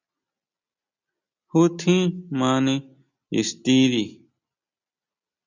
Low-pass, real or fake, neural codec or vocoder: 7.2 kHz; real; none